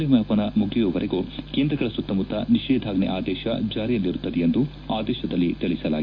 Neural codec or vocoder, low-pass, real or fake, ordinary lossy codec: none; 7.2 kHz; real; MP3, 48 kbps